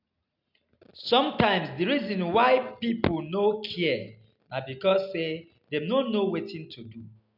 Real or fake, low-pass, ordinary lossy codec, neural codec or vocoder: real; 5.4 kHz; none; none